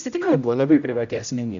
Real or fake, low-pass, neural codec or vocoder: fake; 7.2 kHz; codec, 16 kHz, 0.5 kbps, X-Codec, HuBERT features, trained on general audio